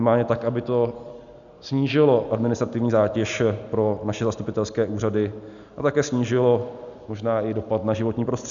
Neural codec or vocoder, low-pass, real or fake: none; 7.2 kHz; real